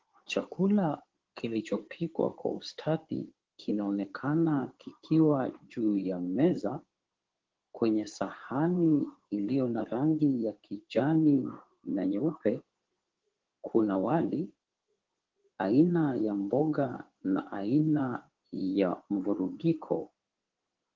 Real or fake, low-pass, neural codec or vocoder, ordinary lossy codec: fake; 7.2 kHz; codec, 16 kHz in and 24 kHz out, 2.2 kbps, FireRedTTS-2 codec; Opus, 16 kbps